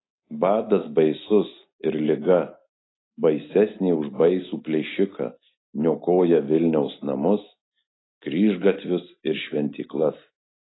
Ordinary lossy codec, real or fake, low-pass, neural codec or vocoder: AAC, 16 kbps; real; 7.2 kHz; none